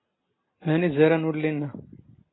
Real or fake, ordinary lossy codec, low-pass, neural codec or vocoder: real; AAC, 16 kbps; 7.2 kHz; none